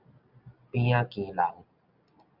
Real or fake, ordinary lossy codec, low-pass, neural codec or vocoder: real; Opus, 64 kbps; 5.4 kHz; none